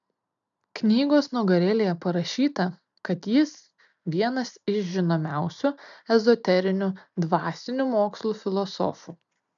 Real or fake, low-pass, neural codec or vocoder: real; 7.2 kHz; none